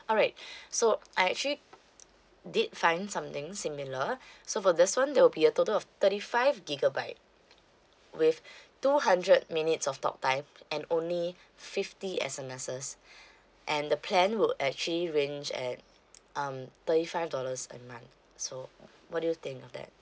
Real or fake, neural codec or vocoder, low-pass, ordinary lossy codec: real; none; none; none